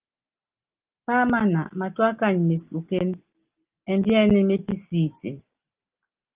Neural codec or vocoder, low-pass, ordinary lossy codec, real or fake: none; 3.6 kHz; Opus, 32 kbps; real